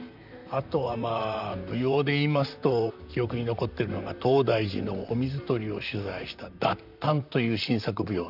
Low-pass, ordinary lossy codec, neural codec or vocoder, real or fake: 5.4 kHz; none; vocoder, 44.1 kHz, 128 mel bands, Pupu-Vocoder; fake